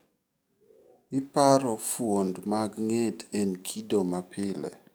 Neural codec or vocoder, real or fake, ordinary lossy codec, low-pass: codec, 44.1 kHz, 7.8 kbps, DAC; fake; none; none